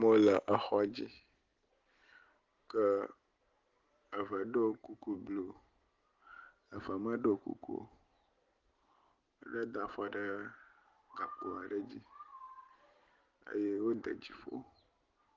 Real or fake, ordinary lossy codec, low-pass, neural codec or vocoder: real; Opus, 16 kbps; 7.2 kHz; none